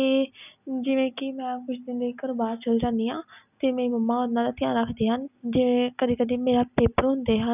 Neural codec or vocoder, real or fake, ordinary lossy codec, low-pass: none; real; none; 3.6 kHz